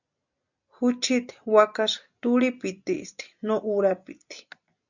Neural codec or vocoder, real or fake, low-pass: none; real; 7.2 kHz